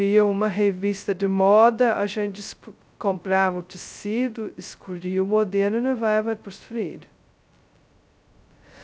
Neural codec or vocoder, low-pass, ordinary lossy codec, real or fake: codec, 16 kHz, 0.2 kbps, FocalCodec; none; none; fake